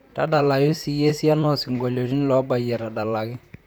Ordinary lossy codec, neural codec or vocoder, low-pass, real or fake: none; vocoder, 44.1 kHz, 128 mel bands, Pupu-Vocoder; none; fake